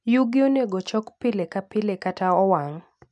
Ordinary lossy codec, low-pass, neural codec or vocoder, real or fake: none; 10.8 kHz; none; real